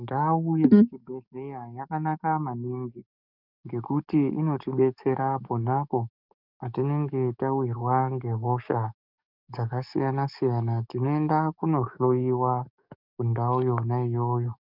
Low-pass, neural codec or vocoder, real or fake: 5.4 kHz; codec, 44.1 kHz, 7.8 kbps, DAC; fake